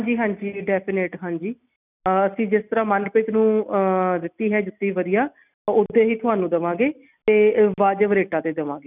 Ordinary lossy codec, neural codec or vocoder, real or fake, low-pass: none; none; real; 3.6 kHz